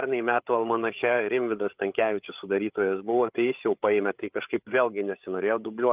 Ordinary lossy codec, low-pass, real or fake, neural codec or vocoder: Opus, 32 kbps; 3.6 kHz; fake; codec, 16 kHz, 8 kbps, FreqCodec, larger model